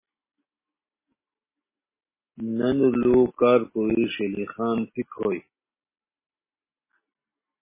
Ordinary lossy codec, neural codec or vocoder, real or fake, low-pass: MP3, 16 kbps; none; real; 3.6 kHz